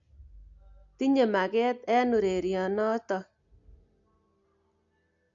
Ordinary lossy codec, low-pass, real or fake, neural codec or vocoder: AAC, 64 kbps; 7.2 kHz; real; none